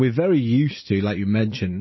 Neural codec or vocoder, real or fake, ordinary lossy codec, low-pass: none; real; MP3, 24 kbps; 7.2 kHz